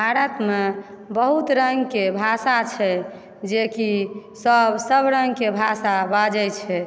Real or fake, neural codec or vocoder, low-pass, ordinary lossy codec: real; none; none; none